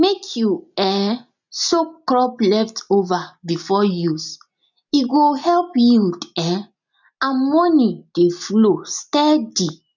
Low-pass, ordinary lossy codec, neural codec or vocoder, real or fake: 7.2 kHz; none; none; real